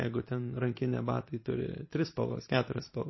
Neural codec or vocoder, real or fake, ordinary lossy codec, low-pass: vocoder, 44.1 kHz, 128 mel bands every 512 samples, BigVGAN v2; fake; MP3, 24 kbps; 7.2 kHz